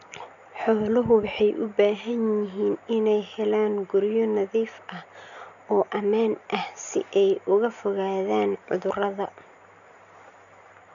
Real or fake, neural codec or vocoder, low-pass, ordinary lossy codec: real; none; 7.2 kHz; none